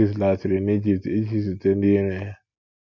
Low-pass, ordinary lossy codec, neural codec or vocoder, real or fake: 7.2 kHz; none; none; real